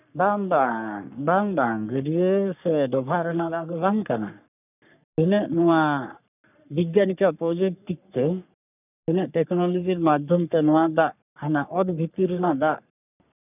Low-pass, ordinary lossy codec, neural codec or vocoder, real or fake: 3.6 kHz; none; codec, 44.1 kHz, 3.4 kbps, Pupu-Codec; fake